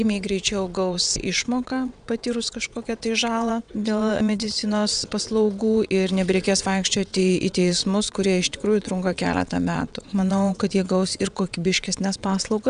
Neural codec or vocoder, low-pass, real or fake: vocoder, 22.05 kHz, 80 mel bands, WaveNeXt; 9.9 kHz; fake